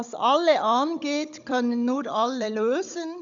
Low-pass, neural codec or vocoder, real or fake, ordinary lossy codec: 7.2 kHz; codec, 16 kHz, 16 kbps, FunCodec, trained on Chinese and English, 50 frames a second; fake; none